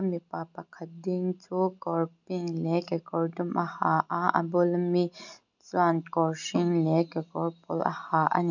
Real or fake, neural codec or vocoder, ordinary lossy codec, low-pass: real; none; none; 7.2 kHz